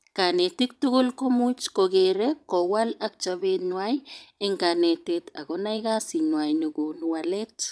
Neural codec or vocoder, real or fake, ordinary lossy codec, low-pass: vocoder, 22.05 kHz, 80 mel bands, Vocos; fake; none; none